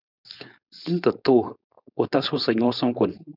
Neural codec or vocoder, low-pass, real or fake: codec, 16 kHz, 4.8 kbps, FACodec; 5.4 kHz; fake